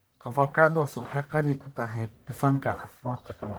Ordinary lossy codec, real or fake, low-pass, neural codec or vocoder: none; fake; none; codec, 44.1 kHz, 1.7 kbps, Pupu-Codec